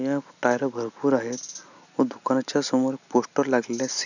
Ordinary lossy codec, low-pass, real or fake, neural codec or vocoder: none; 7.2 kHz; real; none